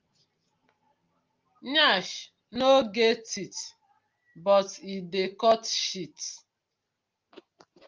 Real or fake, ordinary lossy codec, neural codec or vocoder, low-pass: real; Opus, 24 kbps; none; 7.2 kHz